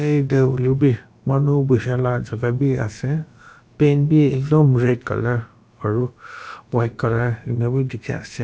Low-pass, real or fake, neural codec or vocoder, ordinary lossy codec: none; fake; codec, 16 kHz, about 1 kbps, DyCAST, with the encoder's durations; none